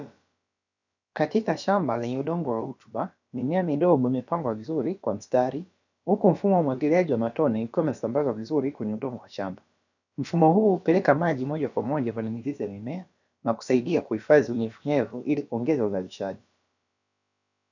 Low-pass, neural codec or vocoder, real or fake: 7.2 kHz; codec, 16 kHz, about 1 kbps, DyCAST, with the encoder's durations; fake